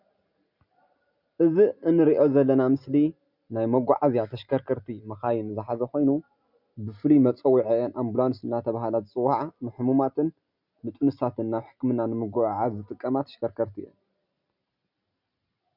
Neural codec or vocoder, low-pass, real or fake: none; 5.4 kHz; real